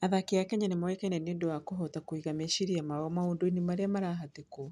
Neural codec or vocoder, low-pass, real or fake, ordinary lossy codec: none; none; real; none